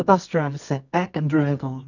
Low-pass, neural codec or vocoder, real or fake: 7.2 kHz; codec, 24 kHz, 0.9 kbps, WavTokenizer, medium music audio release; fake